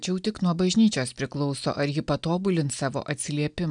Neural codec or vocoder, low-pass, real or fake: none; 9.9 kHz; real